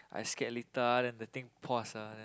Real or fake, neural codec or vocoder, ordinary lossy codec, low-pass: real; none; none; none